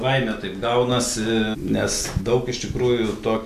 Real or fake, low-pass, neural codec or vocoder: real; 14.4 kHz; none